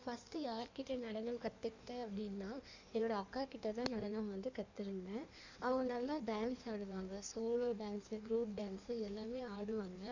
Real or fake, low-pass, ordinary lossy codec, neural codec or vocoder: fake; 7.2 kHz; none; codec, 16 kHz in and 24 kHz out, 1.1 kbps, FireRedTTS-2 codec